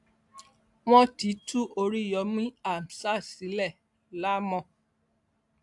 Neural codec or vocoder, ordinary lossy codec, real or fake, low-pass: none; AAC, 64 kbps; real; 10.8 kHz